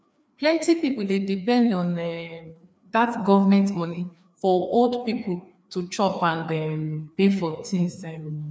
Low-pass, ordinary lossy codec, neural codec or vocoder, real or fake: none; none; codec, 16 kHz, 2 kbps, FreqCodec, larger model; fake